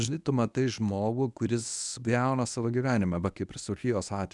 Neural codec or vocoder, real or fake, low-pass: codec, 24 kHz, 0.9 kbps, WavTokenizer, medium speech release version 1; fake; 10.8 kHz